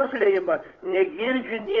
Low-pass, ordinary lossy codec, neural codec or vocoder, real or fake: 7.2 kHz; AAC, 32 kbps; codec, 16 kHz, 16 kbps, FunCodec, trained on Chinese and English, 50 frames a second; fake